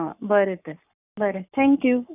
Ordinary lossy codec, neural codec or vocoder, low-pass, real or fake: none; codec, 44.1 kHz, 7.8 kbps, Pupu-Codec; 3.6 kHz; fake